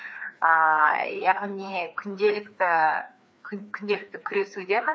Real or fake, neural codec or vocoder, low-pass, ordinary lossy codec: fake; codec, 16 kHz, 2 kbps, FreqCodec, larger model; none; none